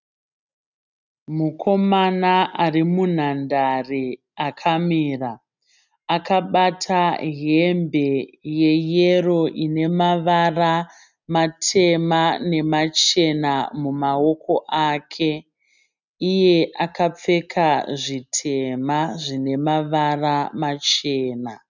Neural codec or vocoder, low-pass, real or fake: none; 7.2 kHz; real